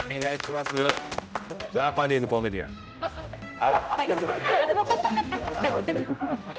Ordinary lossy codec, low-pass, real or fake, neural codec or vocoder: none; none; fake; codec, 16 kHz, 0.5 kbps, X-Codec, HuBERT features, trained on general audio